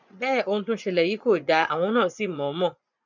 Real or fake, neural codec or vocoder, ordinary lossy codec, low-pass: fake; vocoder, 24 kHz, 100 mel bands, Vocos; none; 7.2 kHz